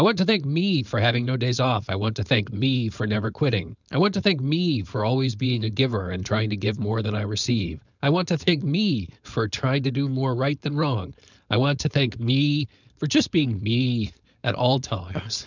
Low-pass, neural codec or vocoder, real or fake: 7.2 kHz; codec, 16 kHz, 4.8 kbps, FACodec; fake